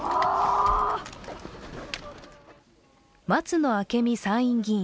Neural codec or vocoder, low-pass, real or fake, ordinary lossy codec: none; none; real; none